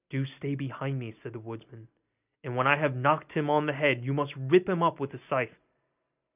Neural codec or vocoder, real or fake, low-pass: none; real; 3.6 kHz